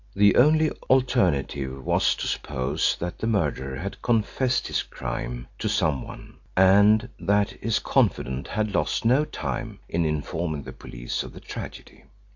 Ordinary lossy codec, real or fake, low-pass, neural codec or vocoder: AAC, 48 kbps; real; 7.2 kHz; none